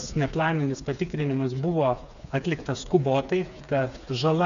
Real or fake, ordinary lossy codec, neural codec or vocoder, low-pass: fake; MP3, 96 kbps; codec, 16 kHz, 4 kbps, FreqCodec, smaller model; 7.2 kHz